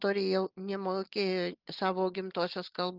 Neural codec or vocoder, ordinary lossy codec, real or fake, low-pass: none; Opus, 32 kbps; real; 5.4 kHz